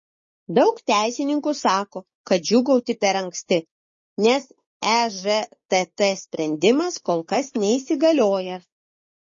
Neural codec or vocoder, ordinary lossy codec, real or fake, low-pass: codec, 16 kHz, 6 kbps, DAC; MP3, 32 kbps; fake; 7.2 kHz